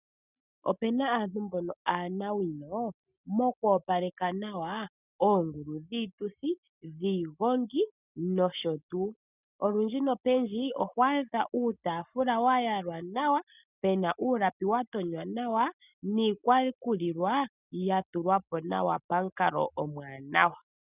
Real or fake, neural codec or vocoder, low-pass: real; none; 3.6 kHz